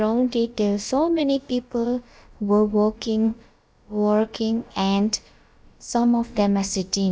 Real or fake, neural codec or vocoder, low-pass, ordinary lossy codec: fake; codec, 16 kHz, about 1 kbps, DyCAST, with the encoder's durations; none; none